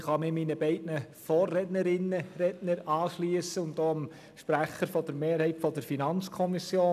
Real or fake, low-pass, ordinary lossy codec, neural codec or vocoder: real; 14.4 kHz; none; none